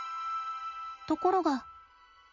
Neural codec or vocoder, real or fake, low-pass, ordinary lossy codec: none; real; 7.2 kHz; Opus, 64 kbps